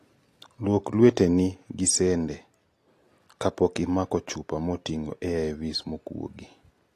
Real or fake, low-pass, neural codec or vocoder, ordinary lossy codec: real; 14.4 kHz; none; AAC, 48 kbps